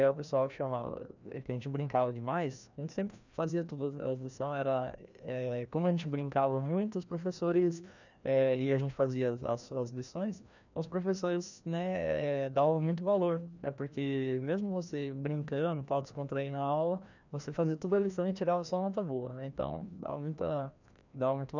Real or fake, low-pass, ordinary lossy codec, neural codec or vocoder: fake; 7.2 kHz; none; codec, 16 kHz, 1 kbps, FreqCodec, larger model